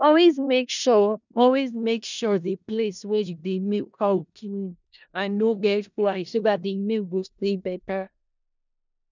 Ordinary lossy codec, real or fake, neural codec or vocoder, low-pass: none; fake; codec, 16 kHz in and 24 kHz out, 0.4 kbps, LongCat-Audio-Codec, four codebook decoder; 7.2 kHz